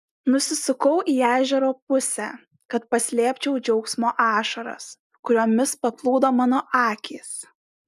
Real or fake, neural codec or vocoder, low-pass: real; none; 14.4 kHz